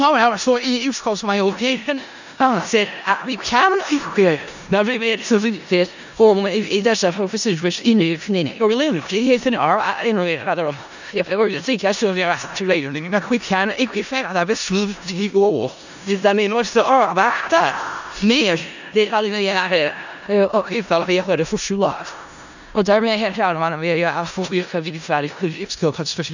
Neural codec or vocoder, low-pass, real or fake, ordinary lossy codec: codec, 16 kHz in and 24 kHz out, 0.4 kbps, LongCat-Audio-Codec, four codebook decoder; 7.2 kHz; fake; none